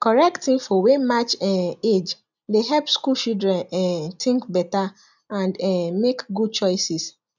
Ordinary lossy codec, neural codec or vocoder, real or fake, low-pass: none; none; real; 7.2 kHz